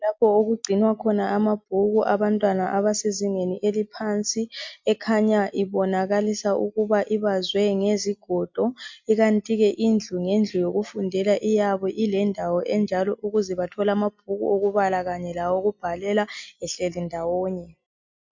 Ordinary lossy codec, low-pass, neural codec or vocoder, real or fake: MP3, 64 kbps; 7.2 kHz; none; real